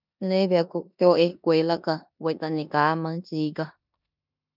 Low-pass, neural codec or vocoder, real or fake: 5.4 kHz; codec, 16 kHz in and 24 kHz out, 0.9 kbps, LongCat-Audio-Codec, four codebook decoder; fake